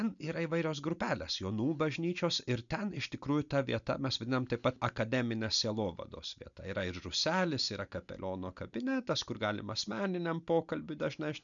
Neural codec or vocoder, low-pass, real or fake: none; 7.2 kHz; real